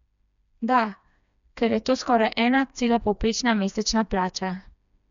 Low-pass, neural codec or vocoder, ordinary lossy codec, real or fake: 7.2 kHz; codec, 16 kHz, 2 kbps, FreqCodec, smaller model; none; fake